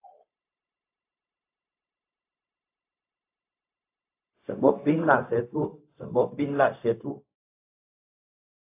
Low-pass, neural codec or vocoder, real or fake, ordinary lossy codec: 3.6 kHz; codec, 16 kHz, 0.4 kbps, LongCat-Audio-Codec; fake; AAC, 24 kbps